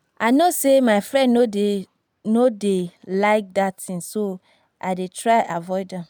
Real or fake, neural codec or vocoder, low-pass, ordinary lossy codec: real; none; none; none